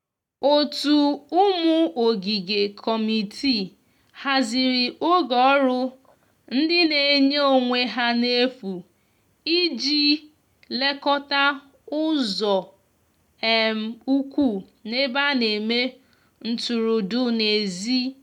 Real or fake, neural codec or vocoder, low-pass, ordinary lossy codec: real; none; 19.8 kHz; none